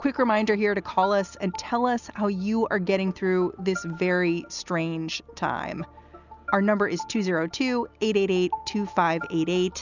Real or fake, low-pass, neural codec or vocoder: real; 7.2 kHz; none